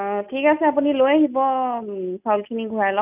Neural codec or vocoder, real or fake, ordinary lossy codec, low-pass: none; real; none; 3.6 kHz